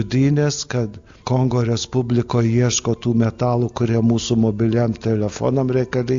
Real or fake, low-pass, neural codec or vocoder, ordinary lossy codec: real; 7.2 kHz; none; AAC, 64 kbps